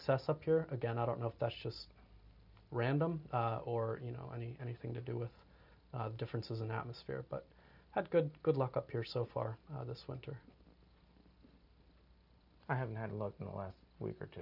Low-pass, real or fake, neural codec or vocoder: 5.4 kHz; real; none